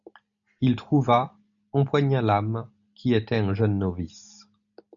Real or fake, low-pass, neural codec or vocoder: real; 7.2 kHz; none